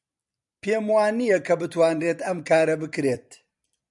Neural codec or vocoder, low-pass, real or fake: vocoder, 44.1 kHz, 128 mel bands every 256 samples, BigVGAN v2; 10.8 kHz; fake